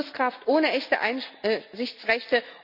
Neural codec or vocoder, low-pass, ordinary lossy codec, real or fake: none; 5.4 kHz; none; real